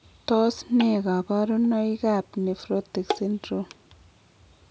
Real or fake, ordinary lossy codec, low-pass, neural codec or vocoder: real; none; none; none